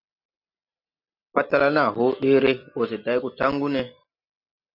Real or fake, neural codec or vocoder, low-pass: real; none; 5.4 kHz